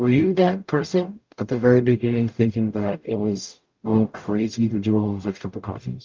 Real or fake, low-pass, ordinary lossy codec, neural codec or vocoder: fake; 7.2 kHz; Opus, 24 kbps; codec, 44.1 kHz, 0.9 kbps, DAC